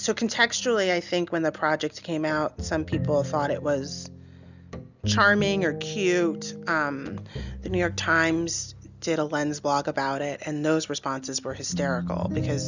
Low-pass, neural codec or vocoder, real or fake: 7.2 kHz; none; real